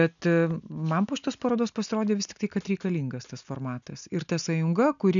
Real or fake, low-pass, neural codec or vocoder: real; 7.2 kHz; none